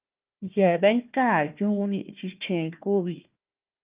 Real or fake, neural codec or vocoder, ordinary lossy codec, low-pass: fake; codec, 16 kHz, 1 kbps, FunCodec, trained on Chinese and English, 50 frames a second; Opus, 24 kbps; 3.6 kHz